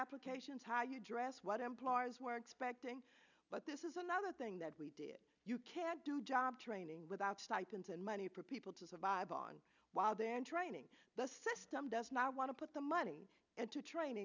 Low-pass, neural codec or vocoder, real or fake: 7.2 kHz; none; real